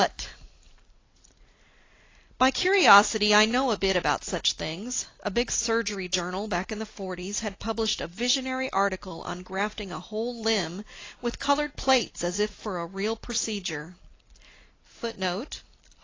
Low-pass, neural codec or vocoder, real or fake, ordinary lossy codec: 7.2 kHz; none; real; AAC, 32 kbps